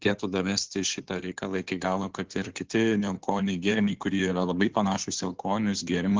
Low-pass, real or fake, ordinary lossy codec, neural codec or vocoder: 7.2 kHz; fake; Opus, 16 kbps; codec, 16 kHz in and 24 kHz out, 2.2 kbps, FireRedTTS-2 codec